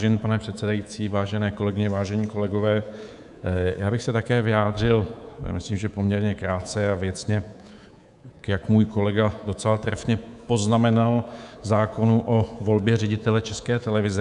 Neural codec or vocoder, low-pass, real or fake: codec, 24 kHz, 3.1 kbps, DualCodec; 10.8 kHz; fake